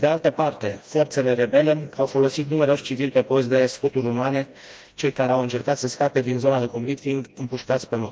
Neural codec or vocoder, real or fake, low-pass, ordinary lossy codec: codec, 16 kHz, 1 kbps, FreqCodec, smaller model; fake; none; none